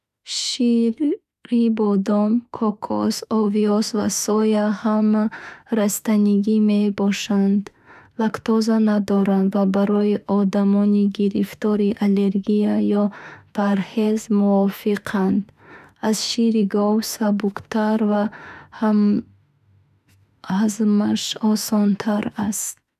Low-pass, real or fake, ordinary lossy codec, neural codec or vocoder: 14.4 kHz; fake; none; autoencoder, 48 kHz, 32 numbers a frame, DAC-VAE, trained on Japanese speech